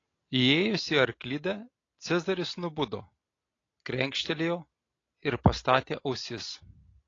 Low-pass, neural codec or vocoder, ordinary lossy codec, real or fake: 7.2 kHz; none; AAC, 32 kbps; real